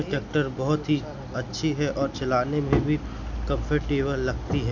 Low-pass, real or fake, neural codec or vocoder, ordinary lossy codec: 7.2 kHz; real; none; none